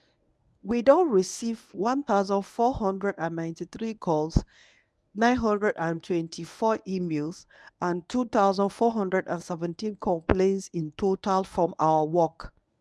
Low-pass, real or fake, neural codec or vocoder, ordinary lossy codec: none; fake; codec, 24 kHz, 0.9 kbps, WavTokenizer, medium speech release version 1; none